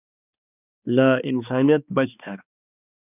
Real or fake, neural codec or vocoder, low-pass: fake; codec, 16 kHz, 2 kbps, X-Codec, HuBERT features, trained on balanced general audio; 3.6 kHz